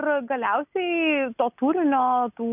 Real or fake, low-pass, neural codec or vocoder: real; 3.6 kHz; none